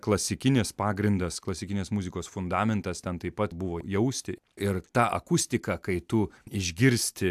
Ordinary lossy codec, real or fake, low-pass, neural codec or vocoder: AAC, 96 kbps; real; 14.4 kHz; none